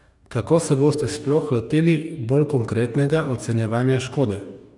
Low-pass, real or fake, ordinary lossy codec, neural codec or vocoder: 10.8 kHz; fake; none; codec, 44.1 kHz, 2.6 kbps, DAC